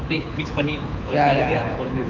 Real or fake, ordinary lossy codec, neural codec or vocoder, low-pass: fake; none; codec, 24 kHz, 6 kbps, HILCodec; 7.2 kHz